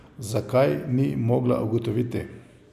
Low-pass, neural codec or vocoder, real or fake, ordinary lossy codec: 14.4 kHz; none; real; none